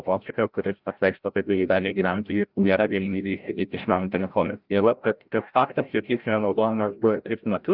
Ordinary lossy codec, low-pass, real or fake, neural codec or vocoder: Opus, 24 kbps; 5.4 kHz; fake; codec, 16 kHz, 0.5 kbps, FreqCodec, larger model